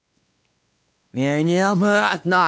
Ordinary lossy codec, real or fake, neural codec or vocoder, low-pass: none; fake; codec, 16 kHz, 2 kbps, X-Codec, WavLM features, trained on Multilingual LibriSpeech; none